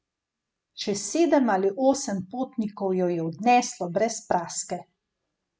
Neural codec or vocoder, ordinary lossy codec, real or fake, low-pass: none; none; real; none